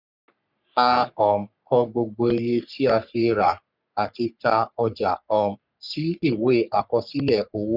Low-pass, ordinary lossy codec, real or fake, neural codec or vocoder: 5.4 kHz; none; fake; codec, 44.1 kHz, 3.4 kbps, Pupu-Codec